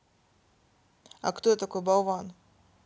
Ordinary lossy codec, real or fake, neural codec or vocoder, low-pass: none; real; none; none